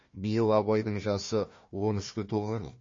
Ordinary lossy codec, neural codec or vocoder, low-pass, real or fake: MP3, 32 kbps; codec, 16 kHz, 1 kbps, FunCodec, trained on Chinese and English, 50 frames a second; 7.2 kHz; fake